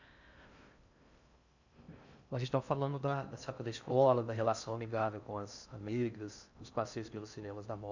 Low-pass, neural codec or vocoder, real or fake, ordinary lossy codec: 7.2 kHz; codec, 16 kHz in and 24 kHz out, 0.6 kbps, FocalCodec, streaming, 2048 codes; fake; none